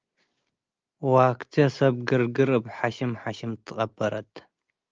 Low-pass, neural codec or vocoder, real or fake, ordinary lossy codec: 7.2 kHz; none; real; Opus, 24 kbps